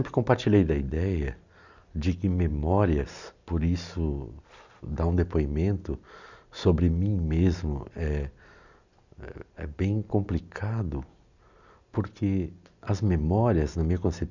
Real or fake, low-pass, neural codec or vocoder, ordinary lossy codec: real; 7.2 kHz; none; none